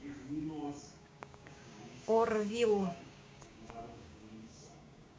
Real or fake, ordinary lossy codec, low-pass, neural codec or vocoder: fake; none; none; codec, 16 kHz, 6 kbps, DAC